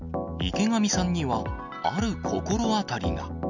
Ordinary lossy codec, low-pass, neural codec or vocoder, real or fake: none; 7.2 kHz; none; real